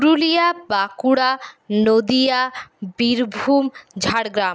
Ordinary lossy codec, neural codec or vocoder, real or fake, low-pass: none; none; real; none